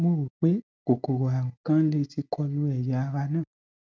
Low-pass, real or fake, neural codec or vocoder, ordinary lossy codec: none; real; none; none